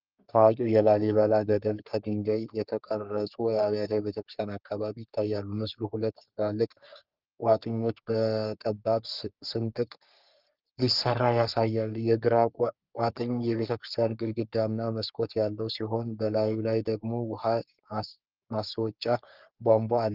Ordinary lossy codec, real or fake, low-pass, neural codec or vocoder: Opus, 24 kbps; fake; 5.4 kHz; codec, 44.1 kHz, 3.4 kbps, Pupu-Codec